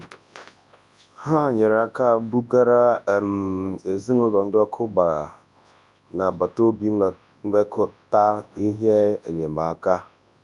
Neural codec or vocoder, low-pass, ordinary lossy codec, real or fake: codec, 24 kHz, 0.9 kbps, WavTokenizer, large speech release; 10.8 kHz; none; fake